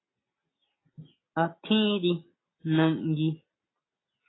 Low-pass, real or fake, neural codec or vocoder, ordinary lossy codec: 7.2 kHz; real; none; AAC, 16 kbps